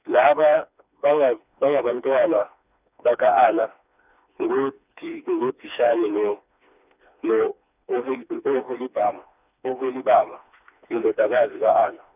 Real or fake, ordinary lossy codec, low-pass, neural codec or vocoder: fake; none; 3.6 kHz; codec, 16 kHz, 2 kbps, FreqCodec, smaller model